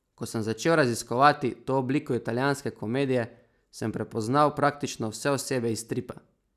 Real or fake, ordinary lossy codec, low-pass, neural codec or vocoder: real; none; 14.4 kHz; none